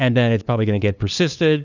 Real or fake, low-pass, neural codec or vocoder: fake; 7.2 kHz; codec, 16 kHz, 2 kbps, FunCodec, trained on LibriTTS, 25 frames a second